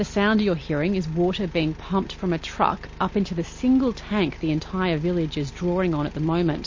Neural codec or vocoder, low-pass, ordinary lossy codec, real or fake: none; 7.2 kHz; MP3, 32 kbps; real